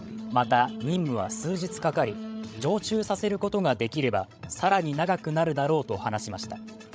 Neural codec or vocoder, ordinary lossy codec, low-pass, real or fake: codec, 16 kHz, 16 kbps, FreqCodec, larger model; none; none; fake